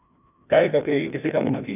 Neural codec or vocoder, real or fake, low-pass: codec, 16 kHz, 1 kbps, FreqCodec, larger model; fake; 3.6 kHz